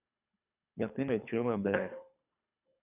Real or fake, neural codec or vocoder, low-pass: fake; codec, 24 kHz, 3 kbps, HILCodec; 3.6 kHz